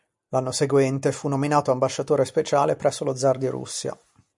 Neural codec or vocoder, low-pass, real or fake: none; 10.8 kHz; real